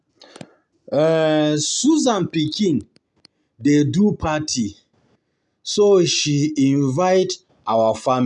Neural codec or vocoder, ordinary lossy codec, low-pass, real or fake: none; none; 10.8 kHz; real